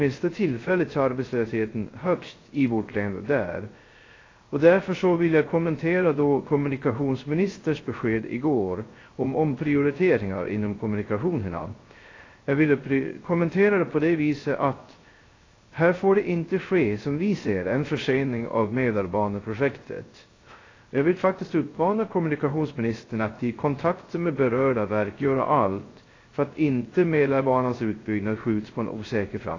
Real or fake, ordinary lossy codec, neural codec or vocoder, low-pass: fake; AAC, 32 kbps; codec, 16 kHz, 0.3 kbps, FocalCodec; 7.2 kHz